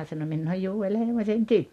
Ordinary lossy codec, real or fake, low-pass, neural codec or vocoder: MP3, 64 kbps; real; 14.4 kHz; none